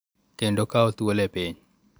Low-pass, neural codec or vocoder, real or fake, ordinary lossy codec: none; none; real; none